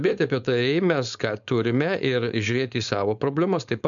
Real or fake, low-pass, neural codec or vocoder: fake; 7.2 kHz; codec, 16 kHz, 4.8 kbps, FACodec